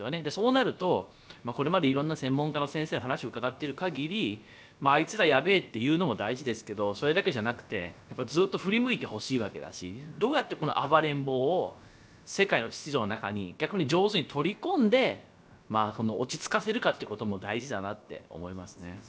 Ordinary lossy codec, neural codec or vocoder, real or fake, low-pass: none; codec, 16 kHz, about 1 kbps, DyCAST, with the encoder's durations; fake; none